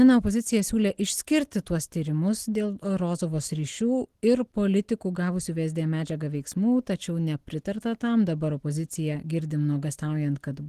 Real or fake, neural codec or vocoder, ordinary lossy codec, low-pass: real; none; Opus, 16 kbps; 14.4 kHz